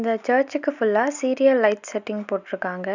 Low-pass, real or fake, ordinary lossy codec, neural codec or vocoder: 7.2 kHz; real; none; none